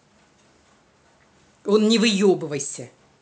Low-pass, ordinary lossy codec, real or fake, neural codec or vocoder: none; none; real; none